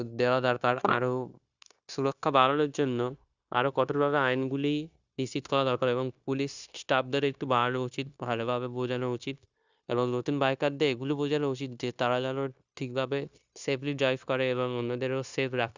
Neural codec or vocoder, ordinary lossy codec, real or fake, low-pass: codec, 16 kHz, 0.9 kbps, LongCat-Audio-Codec; Opus, 64 kbps; fake; 7.2 kHz